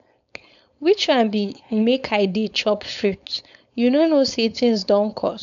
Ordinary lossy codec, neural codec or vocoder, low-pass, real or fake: none; codec, 16 kHz, 4.8 kbps, FACodec; 7.2 kHz; fake